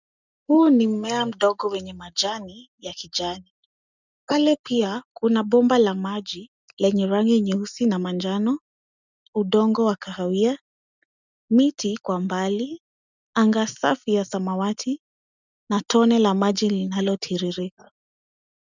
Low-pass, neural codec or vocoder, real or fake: 7.2 kHz; none; real